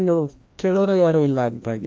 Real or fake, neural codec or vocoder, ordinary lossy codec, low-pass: fake; codec, 16 kHz, 1 kbps, FreqCodec, larger model; none; none